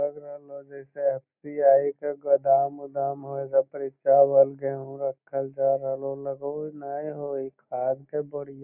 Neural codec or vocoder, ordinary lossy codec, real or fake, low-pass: none; none; real; 3.6 kHz